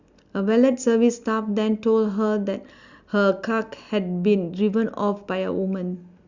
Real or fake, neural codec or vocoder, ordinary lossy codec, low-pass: real; none; Opus, 64 kbps; 7.2 kHz